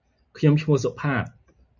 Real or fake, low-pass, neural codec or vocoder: real; 7.2 kHz; none